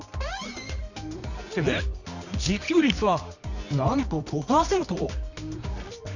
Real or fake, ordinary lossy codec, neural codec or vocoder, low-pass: fake; none; codec, 24 kHz, 0.9 kbps, WavTokenizer, medium music audio release; 7.2 kHz